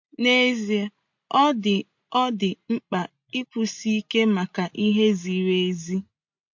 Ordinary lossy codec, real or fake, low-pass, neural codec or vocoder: MP3, 48 kbps; real; 7.2 kHz; none